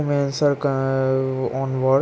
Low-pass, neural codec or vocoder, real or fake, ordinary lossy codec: none; none; real; none